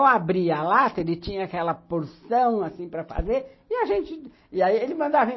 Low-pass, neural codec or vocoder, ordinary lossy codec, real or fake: 7.2 kHz; none; MP3, 24 kbps; real